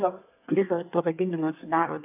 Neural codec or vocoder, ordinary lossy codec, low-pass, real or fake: codec, 24 kHz, 1 kbps, SNAC; AAC, 32 kbps; 3.6 kHz; fake